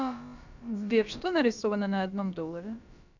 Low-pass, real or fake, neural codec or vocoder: 7.2 kHz; fake; codec, 16 kHz, about 1 kbps, DyCAST, with the encoder's durations